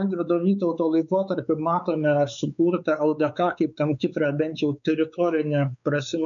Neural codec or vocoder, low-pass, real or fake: codec, 16 kHz, 4 kbps, X-Codec, WavLM features, trained on Multilingual LibriSpeech; 7.2 kHz; fake